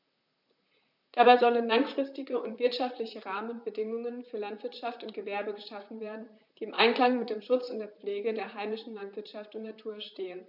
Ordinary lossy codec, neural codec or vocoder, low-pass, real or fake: none; vocoder, 44.1 kHz, 128 mel bands, Pupu-Vocoder; 5.4 kHz; fake